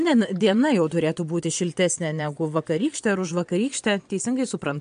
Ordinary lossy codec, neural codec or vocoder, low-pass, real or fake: MP3, 64 kbps; vocoder, 22.05 kHz, 80 mel bands, Vocos; 9.9 kHz; fake